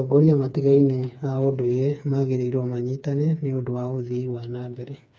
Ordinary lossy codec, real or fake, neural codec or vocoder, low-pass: none; fake; codec, 16 kHz, 4 kbps, FreqCodec, smaller model; none